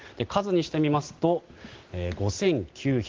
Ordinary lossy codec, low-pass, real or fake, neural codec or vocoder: Opus, 16 kbps; 7.2 kHz; real; none